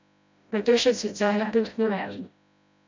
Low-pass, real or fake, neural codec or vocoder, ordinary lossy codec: 7.2 kHz; fake; codec, 16 kHz, 0.5 kbps, FreqCodec, smaller model; MP3, 64 kbps